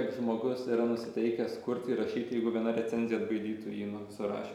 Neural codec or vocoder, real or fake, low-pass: none; real; 19.8 kHz